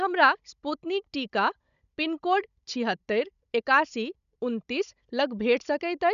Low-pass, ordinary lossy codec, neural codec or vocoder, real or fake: 7.2 kHz; none; codec, 16 kHz, 16 kbps, FunCodec, trained on Chinese and English, 50 frames a second; fake